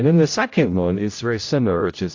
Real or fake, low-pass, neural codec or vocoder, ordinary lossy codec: fake; 7.2 kHz; codec, 16 kHz, 0.5 kbps, X-Codec, HuBERT features, trained on general audio; AAC, 48 kbps